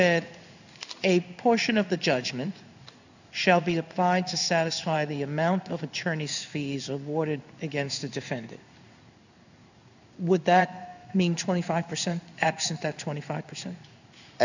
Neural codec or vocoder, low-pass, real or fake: codec, 16 kHz in and 24 kHz out, 1 kbps, XY-Tokenizer; 7.2 kHz; fake